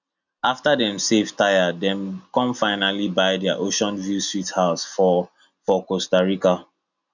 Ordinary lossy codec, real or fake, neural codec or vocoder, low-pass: none; real; none; 7.2 kHz